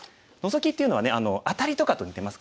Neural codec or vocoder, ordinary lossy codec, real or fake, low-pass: none; none; real; none